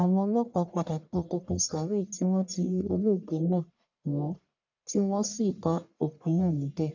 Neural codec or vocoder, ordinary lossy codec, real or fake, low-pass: codec, 44.1 kHz, 1.7 kbps, Pupu-Codec; none; fake; 7.2 kHz